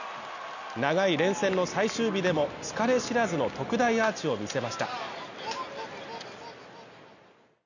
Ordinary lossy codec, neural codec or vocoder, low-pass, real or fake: none; none; 7.2 kHz; real